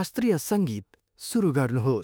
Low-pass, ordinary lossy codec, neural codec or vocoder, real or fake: none; none; autoencoder, 48 kHz, 32 numbers a frame, DAC-VAE, trained on Japanese speech; fake